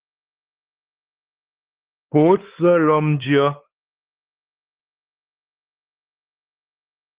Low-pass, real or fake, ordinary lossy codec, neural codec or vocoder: 3.6 kHz; fake; Opus, 16 kbps; codec, 16 kHz, 4 kbps, X-Codec, WavLM features, trained on Multilingual LibriSpeech